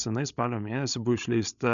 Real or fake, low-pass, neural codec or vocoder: fake; 7.2 kHz; codec, 16 kHz, 16 kbps, FreqCodec, larger model